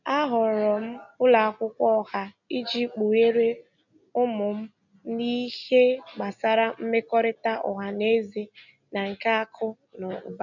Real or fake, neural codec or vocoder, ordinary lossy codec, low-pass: real; none; none; 7.2 kHz